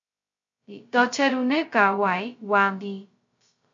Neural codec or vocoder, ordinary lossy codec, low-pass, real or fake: codec, 16 kHz, 0.2 kbps, FocalCodec; MP3, 48 kbps; 7.2 kHz; fake